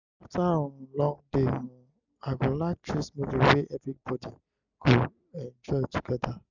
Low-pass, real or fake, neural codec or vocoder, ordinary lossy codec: 7.2 kHz; real; none; none